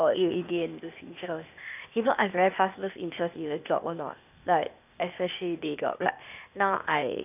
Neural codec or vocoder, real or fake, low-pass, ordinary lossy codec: codec, 16 kHz, 0.8 kbps, ZipCodec; fake; 3.6 kHz; none